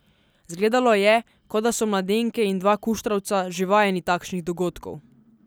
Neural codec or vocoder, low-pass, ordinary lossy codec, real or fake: none; none; none; real